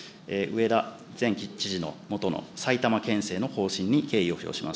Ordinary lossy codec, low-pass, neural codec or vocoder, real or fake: none; none; none; real